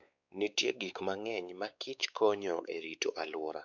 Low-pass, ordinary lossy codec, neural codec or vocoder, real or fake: 7.2 kHz; none; codec, 16 kHz, 4 kbps, X-Codec, WavLM features, trained on Multilingual LibriSpeech; fake